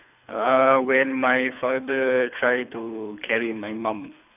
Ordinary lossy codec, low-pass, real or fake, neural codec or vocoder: none; 3.6 kHz; fake; codec, 24 kHz, 3 kbps, HILCodec